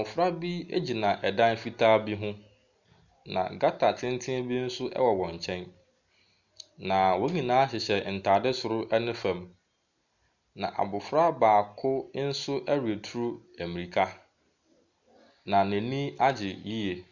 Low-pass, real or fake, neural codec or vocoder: 7.2 kHz; real; none